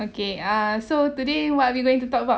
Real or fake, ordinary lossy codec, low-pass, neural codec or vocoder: real; none; none; none